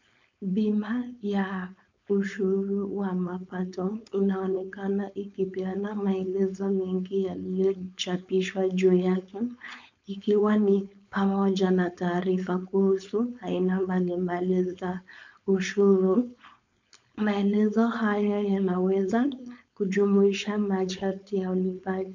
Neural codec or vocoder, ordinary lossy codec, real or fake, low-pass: codec, 16 kHz, 4.8 kbps, FACodec; MP3, 64 kbps; fake; 7.2 kHz